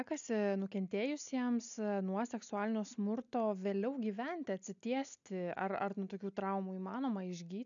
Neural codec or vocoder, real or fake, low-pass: none; real; 7.2 kHz